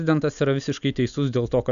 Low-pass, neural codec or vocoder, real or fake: 7.2 kHz; none; real